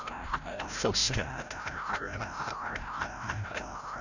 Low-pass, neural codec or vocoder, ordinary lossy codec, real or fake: 7.2 kHz; codec, 16 kHz, 0.5 kbps, FreqCodec, larger model; none; fake